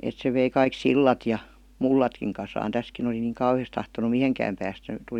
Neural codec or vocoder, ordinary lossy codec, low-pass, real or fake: none; none; 19.8 kHz; real